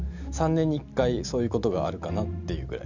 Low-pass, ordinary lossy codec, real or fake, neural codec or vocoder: 7.2 kHz; none; real; none